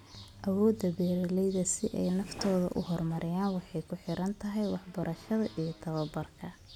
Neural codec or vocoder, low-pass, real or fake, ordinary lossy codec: none; 19.8 kHz; real; none